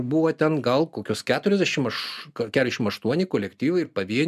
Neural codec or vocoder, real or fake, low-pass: none; real; 14.4 kHz